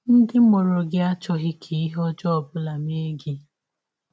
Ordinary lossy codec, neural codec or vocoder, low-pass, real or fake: none; none; none; real